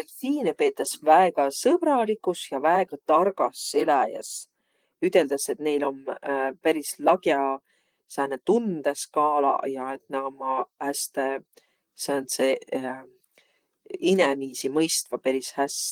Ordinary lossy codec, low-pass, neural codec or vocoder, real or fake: Opus, 24 kbps; 19.8 kHz; vocoder, 44.1 kHz, 128 mel bands, Pupu-Vocoder; fake